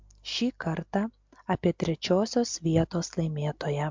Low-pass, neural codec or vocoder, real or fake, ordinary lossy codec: 7.2 kHz; none; real; MP3, 64 kbps